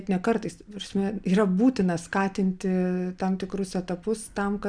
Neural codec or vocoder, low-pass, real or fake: none; 9.9 kHz; real